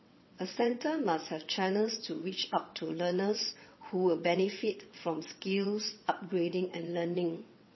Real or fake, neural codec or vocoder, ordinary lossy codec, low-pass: fake; vocoder, 22.05 kHz, 80 mel bands, WaveNeXt; MP3, 24 kbps; 7.2 kHz